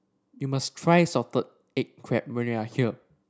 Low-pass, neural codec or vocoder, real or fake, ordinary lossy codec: none; none; real; none